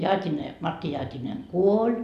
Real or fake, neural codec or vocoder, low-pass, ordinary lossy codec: real; none; 14.4 kHz; none